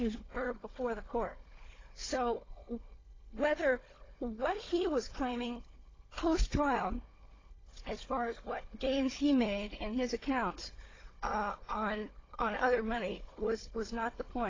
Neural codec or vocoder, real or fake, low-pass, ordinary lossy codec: codec, 16 kHz, 4 kbps, FunCodec, trained on Chinese and English, 50 frames a second; fake; 7.2 kHz; AAC, 32 kbps